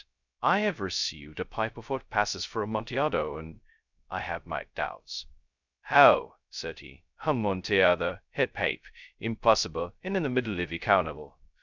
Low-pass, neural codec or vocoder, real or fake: 7.2 kHz; codec, 16 kHz, 0.2 kbps, FocalCodec; fake